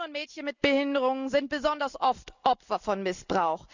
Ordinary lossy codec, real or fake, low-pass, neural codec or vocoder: none; real; 7.2 kHz; none